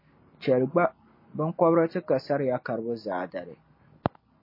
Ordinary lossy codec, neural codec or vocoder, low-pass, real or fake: MP3, 24 kbps; none; 5.4 kHz; real